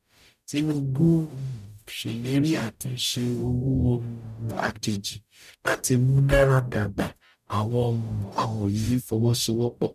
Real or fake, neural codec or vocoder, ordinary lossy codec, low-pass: fake; codec, 44.1 kHz, 0.9 kbps, DAC; none; 14.4 kHz